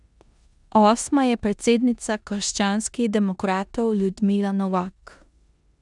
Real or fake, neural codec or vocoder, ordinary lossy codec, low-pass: fake; codec, 16 kHz in and 24 kHz out, 0.9 kbps, LongCat-Audio-Codec, four codebook decoder; none; 10.8 kHz